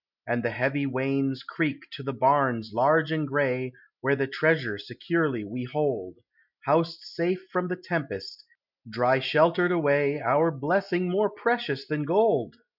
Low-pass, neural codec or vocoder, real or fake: 5.4 kHz; none; real